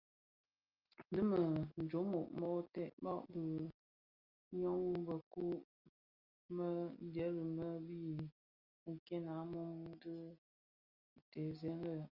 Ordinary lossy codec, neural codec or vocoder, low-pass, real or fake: Opus, 32 kbps; none; 5.4 kHz; real